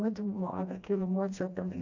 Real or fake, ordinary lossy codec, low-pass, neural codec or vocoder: fake; AAC, 48 kbps; 7.2 kHz; codec, 16 kHz, 1 kbps, FreqCodec, smaller model